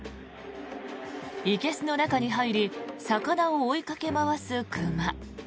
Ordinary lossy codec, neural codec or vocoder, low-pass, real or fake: none; none; none; real